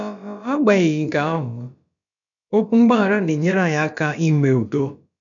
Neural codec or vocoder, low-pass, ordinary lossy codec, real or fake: codec, 16 kHz, about 1 kbps, DyCAST, with the encoder's durations; 7.2 kHz; none; fake